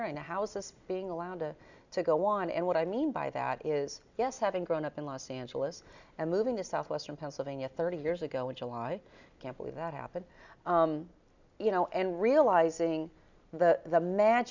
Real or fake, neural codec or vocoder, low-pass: real; none; 7.2 kHz